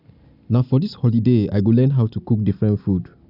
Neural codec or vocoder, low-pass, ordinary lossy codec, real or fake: none; 5.4 kHz; none; real